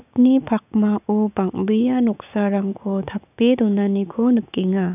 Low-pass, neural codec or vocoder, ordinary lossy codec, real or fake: 3.6 kHz; codec, 16 kHz, 6 kbps, DAC; none; fake